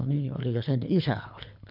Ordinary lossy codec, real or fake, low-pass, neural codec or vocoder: none; fake; 5.4 kHz; codec, 24 kHz, 3 kbps, HILCodec